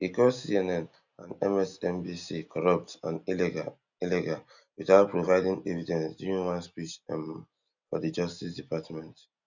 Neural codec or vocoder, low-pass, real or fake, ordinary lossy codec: none; 7.2 kHz; real; none